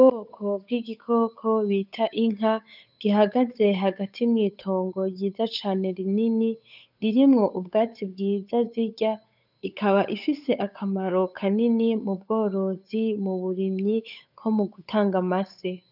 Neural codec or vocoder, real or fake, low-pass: codec, 16 kHz, 8 kbps, FunCodec, trained on Chinese and English, 25 frames a second; fake; 5.4 kHz